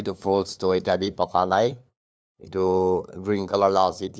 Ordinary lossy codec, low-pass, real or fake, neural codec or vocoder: none; none; fake; codec, 16 kHz, 2 kbps, FunCodec, trained on LibriTTS, 25 frames a second